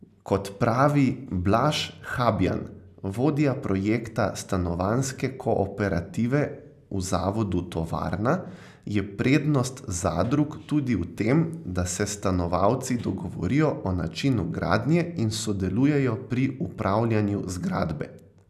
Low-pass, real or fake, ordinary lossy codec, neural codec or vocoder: 14.4 kHz; real; none; none